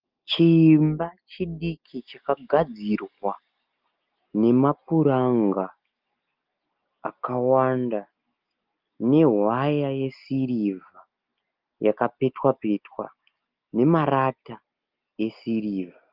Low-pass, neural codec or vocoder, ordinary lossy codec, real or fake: 5.4 kHz; none; Opus, 32 kbps; real